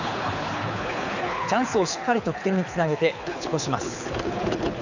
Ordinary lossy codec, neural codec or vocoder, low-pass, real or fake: none; codec, 24 kHz, 6 kbps, HILCodec; 7.2 kHz; fake